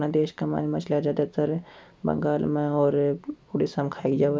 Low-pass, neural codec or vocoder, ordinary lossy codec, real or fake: none; none; none; real